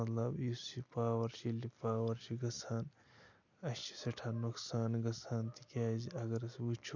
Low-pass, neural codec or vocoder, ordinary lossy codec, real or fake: 7.2 kHz; none; MP3, 64 kbps; real